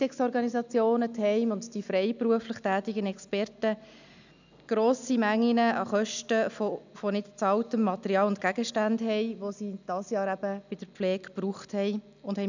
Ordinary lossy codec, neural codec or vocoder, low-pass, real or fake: none; none; 7.2 kHz; real